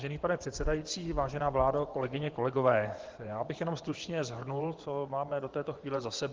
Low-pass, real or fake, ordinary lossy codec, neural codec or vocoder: 7.2 kHz; fake; Opus, 16 kbps; vocoder, 24 kHz, 100 mel bands, Vocos